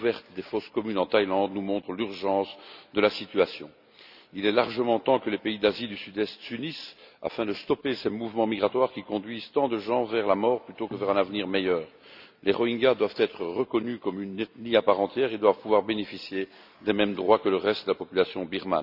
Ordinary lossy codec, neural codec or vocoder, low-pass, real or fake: none; none; 5.4 kHz; real